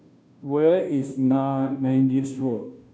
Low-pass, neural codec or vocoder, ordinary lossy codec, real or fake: none; codec, 16 kHz, 0.5 kbps, FunCodec, trained on Chinese and English, 25 frames a second; none; fake